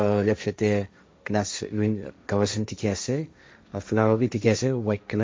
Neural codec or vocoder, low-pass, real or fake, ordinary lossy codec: codec, 16 kHz, 1.1 kbps, Voila-Tokenizer; none; fake; none